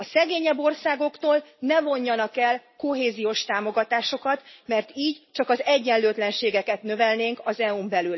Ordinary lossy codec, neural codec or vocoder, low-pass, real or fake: MP3, 24 kbps; none; 7.2 kHz; real